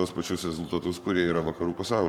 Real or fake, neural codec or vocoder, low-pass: fake; codec, 44.1 kHz, 7.8 kbps, Pupu-Codec; 19.8 kHz